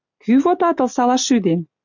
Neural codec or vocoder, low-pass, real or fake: none; 7.2 kHz; real